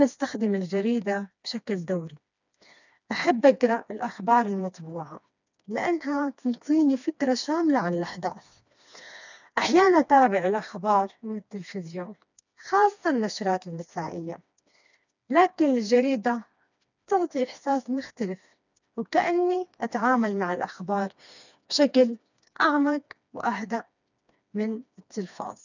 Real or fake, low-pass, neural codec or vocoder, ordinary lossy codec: fake; 7.2 kHz; codec, 16 kHz, 2 kbps, FreqCodec, smaller model; none